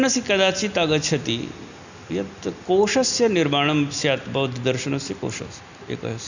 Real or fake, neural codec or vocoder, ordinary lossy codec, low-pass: real; none; none; 7.2 kHz